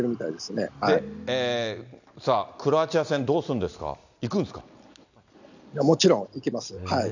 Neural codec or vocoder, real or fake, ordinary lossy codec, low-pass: none; real; none; 7.2 kHz